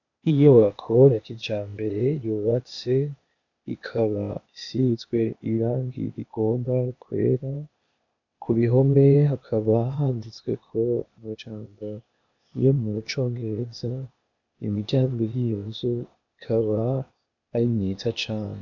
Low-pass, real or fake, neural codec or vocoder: 7.2 kHz; fake; codec, 16 kHz, 0.8 kbps, ZipCodec